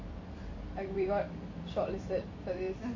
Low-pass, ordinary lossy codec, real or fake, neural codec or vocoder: 7.2 kHz; AAC, 48 kbps; fake; vocoder, 44.1 kHz, 128 mel bands every 256 samples, BigVGAN v2